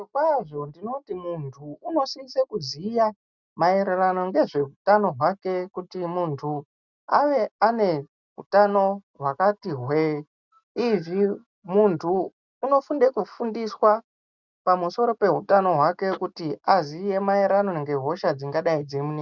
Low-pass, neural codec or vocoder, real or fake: 7.2 kHz; none; real